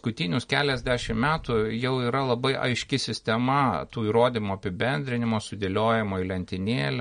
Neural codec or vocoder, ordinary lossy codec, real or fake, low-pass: none; MP3, 48 kbps; real; 9.9 kHz